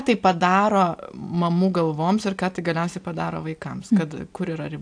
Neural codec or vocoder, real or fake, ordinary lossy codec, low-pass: none; real; AAC, 64 kbps; 9.9 kHz